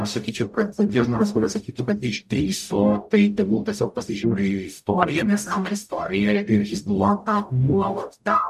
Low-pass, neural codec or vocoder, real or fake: 14.4 kHz; codec, 44.1 kHz, 0.9 kbps, DAC; fake